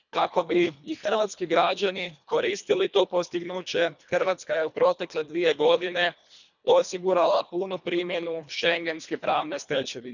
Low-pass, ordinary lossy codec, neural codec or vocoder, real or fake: 7.2 kHz; none; codec, 24 kHz, 1.5 kbps, HILCodec; fake